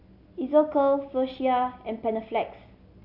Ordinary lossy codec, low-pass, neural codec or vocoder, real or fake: AAC, 48 kbps; 5.4 kHz; none; real